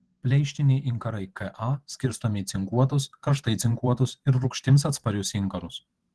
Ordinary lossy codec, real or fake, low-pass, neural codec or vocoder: Opus, 16 kbps; fake; 10.8 kHz; vocoder, 44.1 kHz, 128 mel bands every 512 samples, BigVGAN v2